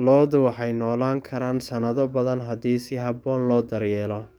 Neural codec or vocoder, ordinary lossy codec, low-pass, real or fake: codec, 44.1 kHz, 7.8 kbps, DAC; none; none; fake